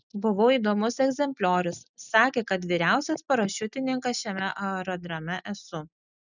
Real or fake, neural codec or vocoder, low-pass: real; none; 7.2 kHz